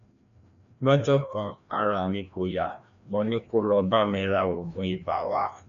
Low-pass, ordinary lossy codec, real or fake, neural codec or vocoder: 7.2 kHz; none; fake; codec, 16 kHz, 1 kbps, FreqCodec, larger model